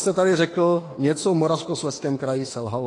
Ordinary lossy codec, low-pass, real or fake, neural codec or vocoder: AAC, 32 kbps; 10.8 kHz; fake; autoencoder, 48 kHz, 32 numbers a frame, DAC-VAE, trained on Japanese speech